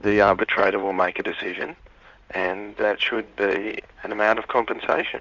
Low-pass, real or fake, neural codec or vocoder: 7.2 kHz; fake; codec, 16 kHz in and 24 kHz out, 2.2 kbps, FireRedTTS-2 codec